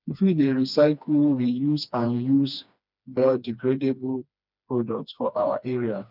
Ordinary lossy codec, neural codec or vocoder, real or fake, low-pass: none; codec, 16 kHz, 2 kbps, FreqCodec, smaller model; fake; 5.4 kHz